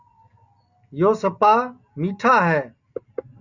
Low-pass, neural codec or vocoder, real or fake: 7.2 kHz; none; real